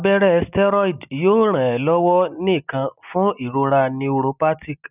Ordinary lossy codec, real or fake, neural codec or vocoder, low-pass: none; real; none; 3.6 kHz